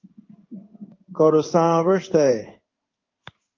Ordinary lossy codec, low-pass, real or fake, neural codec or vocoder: Opus, 24 kbps; 7.2 kHz; fake; codec, 16 kHz in and 24 kHz out, 1 kbps, XY-Tokenizer